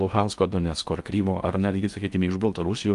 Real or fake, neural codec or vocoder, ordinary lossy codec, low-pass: fake; codec, 16 kHz in and 24 kHz out, 0.8 kbps, FocalCodec, streaming, 65536 codes; Opus, 32 kbps; 10.8 kHz